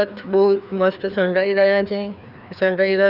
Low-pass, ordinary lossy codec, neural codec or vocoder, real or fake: 5.4 kHz; none; codec, 16 kHz, 2 kbps, FreqCodec, larger model; fake